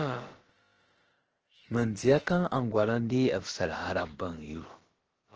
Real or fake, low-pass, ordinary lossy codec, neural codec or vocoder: fake; 7.2 kHz; Opus, 16 kbps; codec, 16 kHz, about 1 kbps, DyCAST, with the encoder's durations